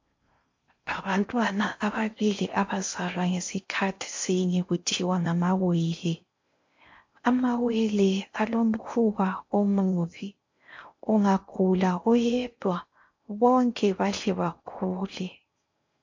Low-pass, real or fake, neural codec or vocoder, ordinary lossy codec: 7.2 kHz; fake; codec, 16 kHz in and 24 kHz out, 0.6 kbps, FocalCodec, streaming, 4096 codes; MP3, 48 kbps